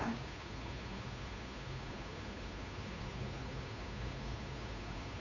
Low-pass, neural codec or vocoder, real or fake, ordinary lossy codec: 7.2 kHz; codec, 16 kHz, 2 kbps, FunCodec, trained on Chinese and English, 25 frames a second; fake; none